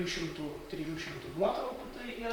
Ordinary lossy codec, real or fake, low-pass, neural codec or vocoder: MP3, 96 kbps; fake; 19.8 kHz; vocoder, 44.1 kHz, 128 mel bands, Pupu-Vocoder